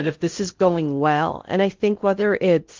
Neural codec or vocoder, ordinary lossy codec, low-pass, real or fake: codec, 16 kHz in and 24 kHz out, 0.6 kbps, FocalCodec, streaming, 4096 codes; Opus, 32 kbps; 7.2 kHz; fake